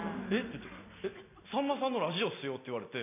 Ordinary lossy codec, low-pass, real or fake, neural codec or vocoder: none; 3.6 kHz; real; none